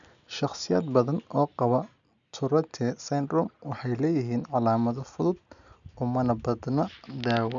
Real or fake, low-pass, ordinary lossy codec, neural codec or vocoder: real; 7.2 kHz; none; none